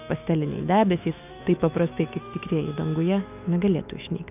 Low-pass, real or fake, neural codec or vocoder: 3.6 kHz; fake; autoencoder, 48 kHz, 128 numbers a frame, DAC-VAE, trained on Japanese speech